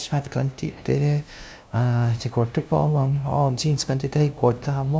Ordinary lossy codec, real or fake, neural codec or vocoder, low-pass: none; fake; codec, 16 kHz, 0.5 kbps, FunCodec, trained on LibriTTS, 25 frames a second; none